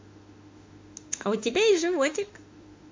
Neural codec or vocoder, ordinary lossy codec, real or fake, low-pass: autoencoder, 48 kHz, 32 numbers a frame, DAC-VAE, trained on Japanese speech; MP3, 48 kbps; fake; 7.2 kHz